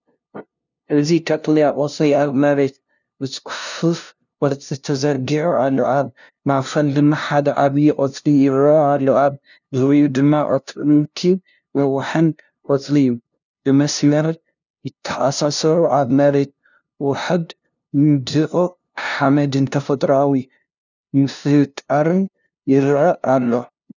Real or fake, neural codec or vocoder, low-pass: fake; codec, 16 kHz, 0.5 kbps, FunCodec, trained on LibriTTS, 25 frames a second; 7.2 kHz